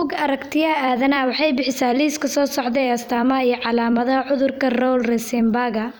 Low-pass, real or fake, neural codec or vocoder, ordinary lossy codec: none; fake; vocoder, 44.1 kHz, 128 mel bands every 256 samples, BigVGAN v2; none